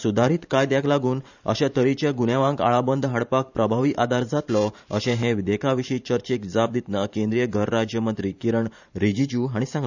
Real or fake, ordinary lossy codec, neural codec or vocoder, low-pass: fake; none; vocoder, 44.1 kHz, 128 mel bands every 512 samples, BigVGAN v2; 7.2 kHz